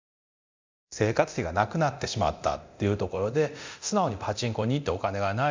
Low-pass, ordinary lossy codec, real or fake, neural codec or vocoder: 7.2 kHz; MP3, 64 kbps; fake; codec, 24 kHz, 0.9 kbps, DualCodec